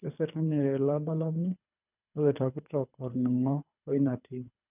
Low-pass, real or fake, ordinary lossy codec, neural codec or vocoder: 3.6 kHz; fake; none; codec, 24 kHz, 3 kbps, HILCodec